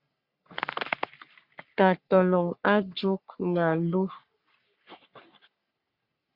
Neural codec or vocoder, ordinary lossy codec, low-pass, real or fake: codec, 44.1 kHz, 3.4 kbps, Pupu-Codec; Opus, 64 kbps; 5.4 kHz; fake